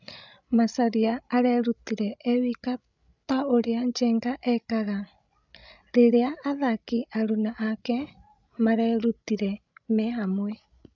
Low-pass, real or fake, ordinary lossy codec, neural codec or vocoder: 7.2 kHz; fake; none; codec, 16 kHz, 16 kbps, FreqCodec, larger model